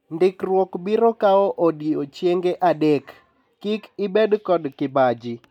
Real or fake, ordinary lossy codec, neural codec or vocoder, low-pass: real; none; none; 19.8 kHz